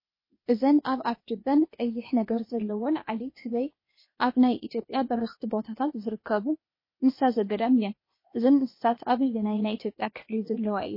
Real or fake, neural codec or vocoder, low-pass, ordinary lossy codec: fake; codec, 16 kHz, 0.8 kbps, ZipCodec; 5.4 kHz; MP3, 24 kbps